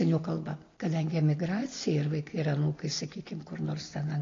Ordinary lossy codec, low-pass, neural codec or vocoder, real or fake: AAC, 32 kbps; 7.2 kHz; none; real